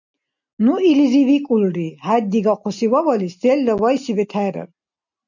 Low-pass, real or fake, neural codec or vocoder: 7.2 kHz; real; none